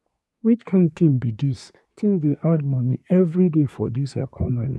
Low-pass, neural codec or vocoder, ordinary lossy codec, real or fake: none; codec, 24 kHz, 1 kbps, SNAC; none; fake